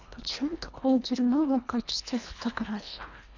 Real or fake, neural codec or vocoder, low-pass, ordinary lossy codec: fake; codec, 24 kHz, 1.5 kbps, HILCodec; 7.2 kHz; none